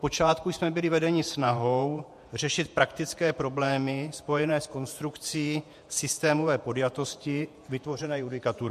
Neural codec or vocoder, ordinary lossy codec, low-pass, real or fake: vocoder, 48 kHz, 128 mel bands, Vocos; MP3, 64 kbps; 14.4 kHz; fake